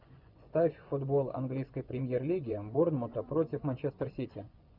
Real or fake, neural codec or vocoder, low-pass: fake; vocoder, 24 kHz, 100 mel bands, Vocos; 5.4 kHz